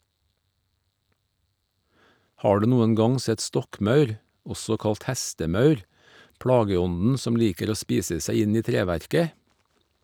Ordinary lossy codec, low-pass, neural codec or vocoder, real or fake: none; none; none; real